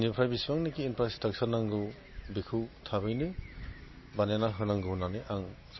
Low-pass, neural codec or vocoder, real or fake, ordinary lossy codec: 7.2 kHz; none; real; MP3, 24 kbps